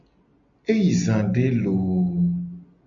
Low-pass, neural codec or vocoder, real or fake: 7.2 kHz; none; real